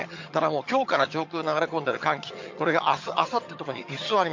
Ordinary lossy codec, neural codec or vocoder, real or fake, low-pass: MP3, 48 kbps; vocoder, 22.05 kHz, 80 mel bands, HiFi-GAN; fake; 7.2 kHz